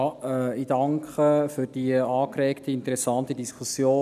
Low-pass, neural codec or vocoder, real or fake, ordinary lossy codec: 14.4 kHz; none; real; none